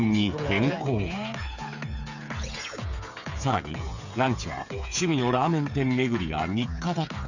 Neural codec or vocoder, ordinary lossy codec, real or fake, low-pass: codec, 16 kHz, 8 kbps, FreqCodec, smaller model; none; fake; 7.2 kHz